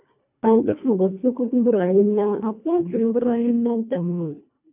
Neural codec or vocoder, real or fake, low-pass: codec, 24 kHz, 1.5 kbps, HILCodec; fake; 3.6 kHz